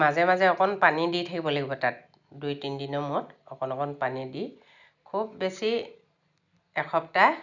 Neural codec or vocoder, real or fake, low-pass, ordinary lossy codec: none; real; 7.2 kHz; none